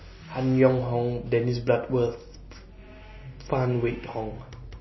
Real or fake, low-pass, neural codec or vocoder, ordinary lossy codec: real; 7.2 kHz; none; MP3, 24 kbps